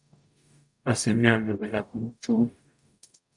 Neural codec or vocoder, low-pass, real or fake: codec, 44.1 kHz, 0.9 kbps, DAC; 10.8 kHz; fake